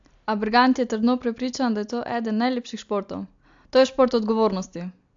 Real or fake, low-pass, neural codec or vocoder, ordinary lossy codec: real; 7.2 kHz; none; AAC, 64 kbps